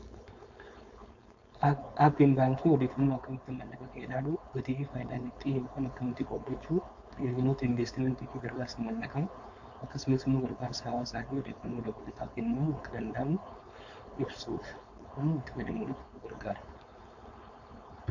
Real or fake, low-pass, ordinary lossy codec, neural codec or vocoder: fake; 7.2 kHz; AAC, 48 kbps; codec, 16 kHz, 4.8 kbps, FACodec